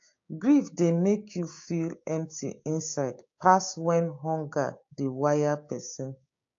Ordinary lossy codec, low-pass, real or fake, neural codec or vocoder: AAC, 48 kbps; 7.2 kHz; fake; codec, 16 kHz, 6 kbps, DAC